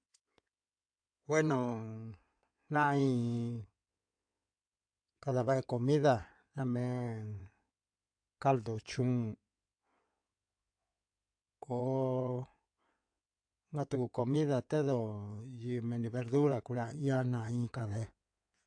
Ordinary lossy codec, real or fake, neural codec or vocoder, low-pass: AAC, 64 kbps; fake; codec, 16 kHz in and 24 kHz out, 2.2 kbps, FireRedTTS-2 codec; 9.9 kHz